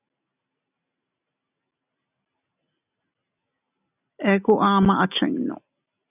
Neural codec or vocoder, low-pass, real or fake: none; 3.6 kHz; real